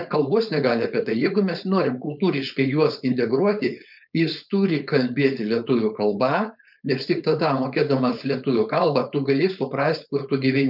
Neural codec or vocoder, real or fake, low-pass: codec, 16 kHz, 4.8 kbps, FACodec; fake; 5.4 kHz